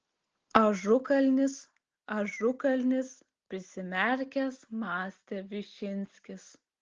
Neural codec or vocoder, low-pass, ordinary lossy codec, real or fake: none; 7.2 kHz; Opus, 16 kbps; real